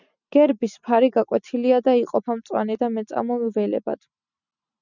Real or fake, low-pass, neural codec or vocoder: real; 7.2 kHz; none